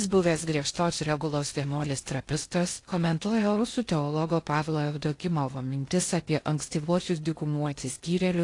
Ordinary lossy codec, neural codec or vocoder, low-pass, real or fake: AAC, 48 kbps; codec, 16 kHz in and 24 kHz out, 0.6 kbps, FocalCodec, streaming, 2048 codes; 10.8 kHz; fake